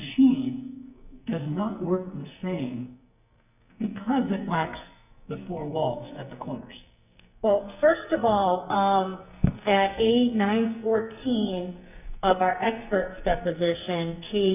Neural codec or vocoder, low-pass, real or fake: codec, 44.1 kHz, 2.6 kbps, SNAC; 3.6 kHz; fake